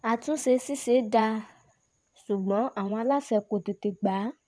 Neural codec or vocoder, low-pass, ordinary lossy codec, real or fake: vocoder, 22.05 kHz, 80 mel bands, WaveNeXt; none; none; fake